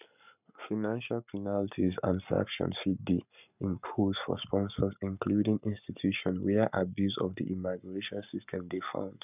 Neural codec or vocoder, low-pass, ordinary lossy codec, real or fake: codec, 44.1 kHz, 7.8 kbps, Pupu-Codec; 3.6 kHz; none; fake